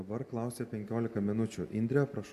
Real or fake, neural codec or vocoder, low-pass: real; none; 14.4 kHz